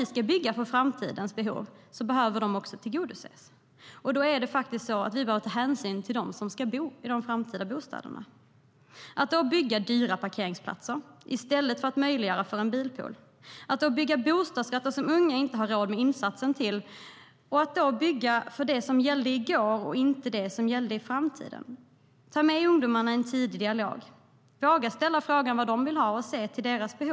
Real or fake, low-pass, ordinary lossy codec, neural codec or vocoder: real; none; none; none